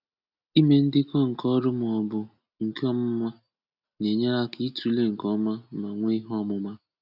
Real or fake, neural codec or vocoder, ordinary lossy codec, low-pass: real; none; none; 5.4 kHz